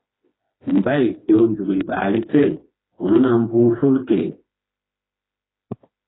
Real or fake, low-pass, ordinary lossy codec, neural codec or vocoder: fake; 7.2 kHz; AAC, 16 kbps; codec, 16 kHz, 2 kbps, FreqCodec, smaller model